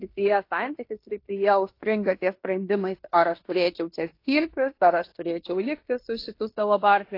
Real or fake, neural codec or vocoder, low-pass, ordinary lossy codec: fake; codec, 16 kHz in and 24 kHz out, 0.9 kbps, LongCat-Audio-Codec, fine tuned four codebook decoder; 5.4 kHz; AAC, 32 kbps